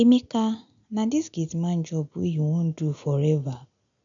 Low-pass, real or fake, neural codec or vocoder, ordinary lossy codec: 7.2 kHz; real; none; none